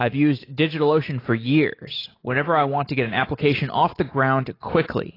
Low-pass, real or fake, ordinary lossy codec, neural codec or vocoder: 5.4 kHz; real; AAC, 24 kbps; none